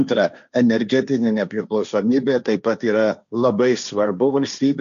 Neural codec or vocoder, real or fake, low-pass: codec, 16 kHz, 1.1 kbps, Voila-Tokenizer; fake; 7.2 kHz